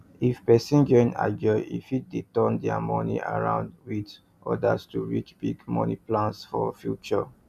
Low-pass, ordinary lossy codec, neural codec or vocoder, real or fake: 14.4 kHz; none; none; real